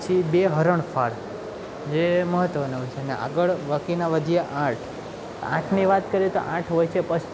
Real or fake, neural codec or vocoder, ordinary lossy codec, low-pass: real; none; none; none